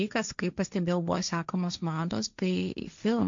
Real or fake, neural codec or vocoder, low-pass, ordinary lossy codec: fake; codec, 16 kHz, 1.1 kbps, Voila-Tokenizer; 7.2 kHz; MP3, 64 kbps